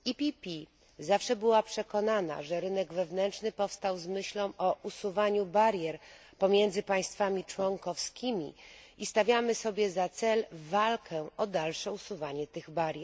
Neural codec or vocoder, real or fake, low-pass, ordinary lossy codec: none; real; none; none